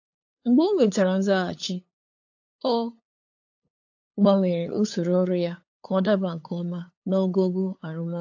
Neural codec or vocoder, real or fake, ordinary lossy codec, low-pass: codec, 16 kHz, 8 kbps, FunCodec, trained on LibriTTS, 25 frames a second; fake; AAC, 48 kbps; 7.2 kHz